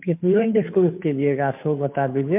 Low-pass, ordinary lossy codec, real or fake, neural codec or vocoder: 3.6 kHz; MP3, 32 kbps; fake; codec, 16 kHz, 2 kbps, FunCodec, trained on Chinese and English, 25 frames a second